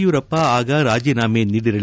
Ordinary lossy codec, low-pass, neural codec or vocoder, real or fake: none; none; none; real